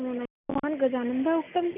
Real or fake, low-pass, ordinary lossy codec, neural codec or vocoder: real; 3.6 kHz; none; none